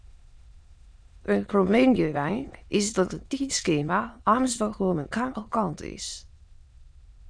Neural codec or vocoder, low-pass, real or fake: autoencoder, 22.05 kHz, a latent of 192 numbers a frame, VITS, trained on many speakers; 9.9 kHz; fake